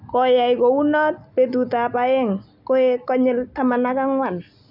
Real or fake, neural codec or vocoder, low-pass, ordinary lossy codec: real; none; 5.4 kHz; none